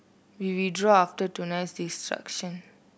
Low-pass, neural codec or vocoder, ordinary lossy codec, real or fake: none; none; none; real